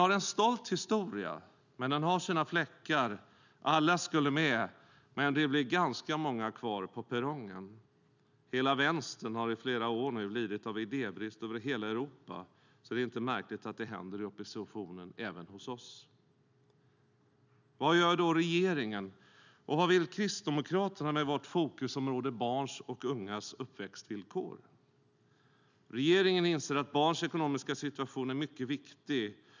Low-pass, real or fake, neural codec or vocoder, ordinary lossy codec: 7.2 kHz; real; none; none